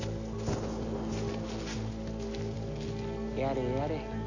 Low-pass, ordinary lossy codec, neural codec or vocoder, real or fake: 7.2 kHz; none; none; real